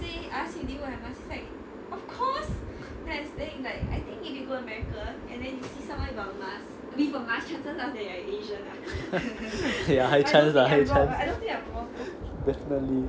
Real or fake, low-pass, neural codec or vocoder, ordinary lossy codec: real; none; none; none